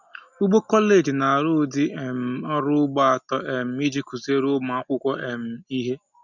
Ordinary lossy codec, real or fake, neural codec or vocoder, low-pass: none; real; none; 7.2 kHz